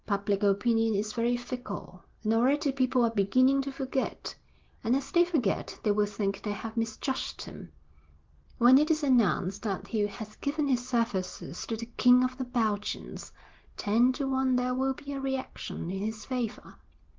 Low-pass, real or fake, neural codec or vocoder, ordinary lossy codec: 7.2 kHz; real; none; Opus, 16 kbps